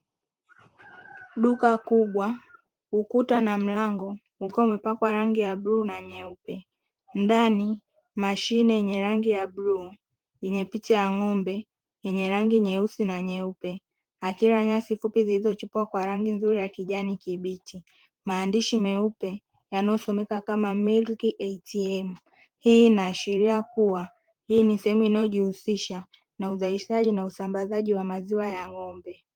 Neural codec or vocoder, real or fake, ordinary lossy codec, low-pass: vocoder, 44.1 kHz, 128 mel bands, Pupu-Vocoder; fake; Opus, 32 kbps; 19.8 kHz